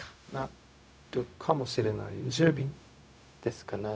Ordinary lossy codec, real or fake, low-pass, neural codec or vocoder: none; fake; none; codec, 16 kHz, 0.4 kbps, LongCat-Audio-Codec